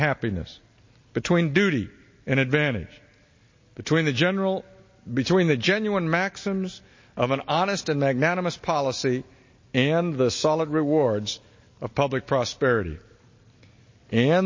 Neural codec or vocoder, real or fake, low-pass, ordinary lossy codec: none; real; 7.2 kHz; MP3, 32 kbps